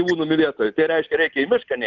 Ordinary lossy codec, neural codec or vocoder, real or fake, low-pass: Opus, 24 kbps; none; real; 7.2 kHz